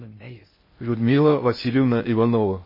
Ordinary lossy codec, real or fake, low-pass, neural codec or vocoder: MP3, 24 kbps; fake; 5.4 kHz; codec, 16 kHz in and 24 kHz out, 0.6 kbps, FocalCodec, streaming, 2048 codes